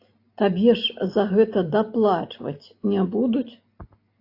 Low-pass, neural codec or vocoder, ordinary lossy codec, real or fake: 5.4 kHz; none; AAC, 32 kbps; real